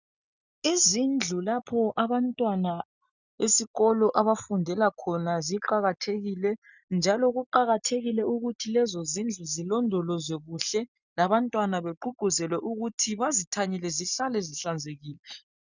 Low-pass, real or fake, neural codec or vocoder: 7.2 kHz; real; none